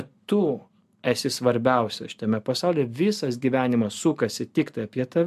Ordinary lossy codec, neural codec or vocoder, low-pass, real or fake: MP3, 96 kbps; none; 14.4 kHz; real